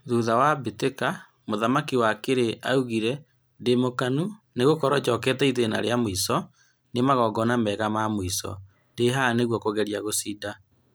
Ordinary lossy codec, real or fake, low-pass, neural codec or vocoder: none; real; none; none